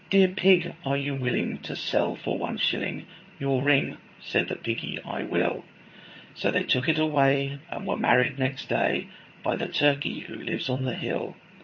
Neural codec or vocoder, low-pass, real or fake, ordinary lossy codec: vocoder, 22.05 kHz, 80 mel bands, HiFi-GAN; 7.2 kHz; fake; MP3, 32 kbps